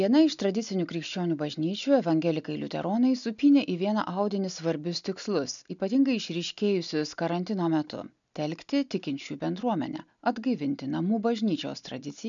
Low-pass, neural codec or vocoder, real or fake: 7.2 kHz; none; real